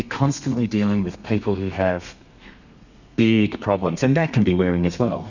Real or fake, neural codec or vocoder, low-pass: fake; codec, 32 kHz, 1.9 kbps, SNAC; 7.2 kHz